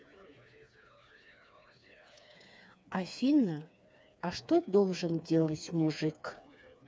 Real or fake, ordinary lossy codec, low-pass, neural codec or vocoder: fake; none; none; codec, 16 kHz, 4 kbps, FreqCodec, smaller model